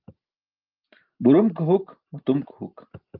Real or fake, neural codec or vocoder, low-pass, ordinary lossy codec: real; none; 5.4 kHz; Opus, 24 kbps